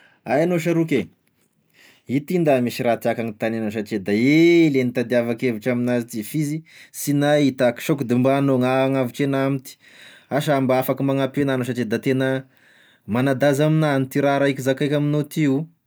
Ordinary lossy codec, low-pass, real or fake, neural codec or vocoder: none; none; real; none